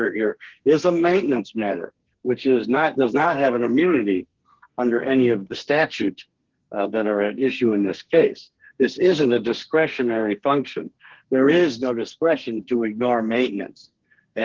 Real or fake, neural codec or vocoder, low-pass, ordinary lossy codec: fake; codec, 32 kHz, 1.9 kbps, SNAC; 7.2 kHz; Opus, 16 kbps